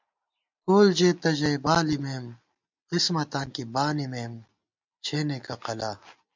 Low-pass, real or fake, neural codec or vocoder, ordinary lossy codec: 7.2 kHz; real; none; MP3, 64 kbps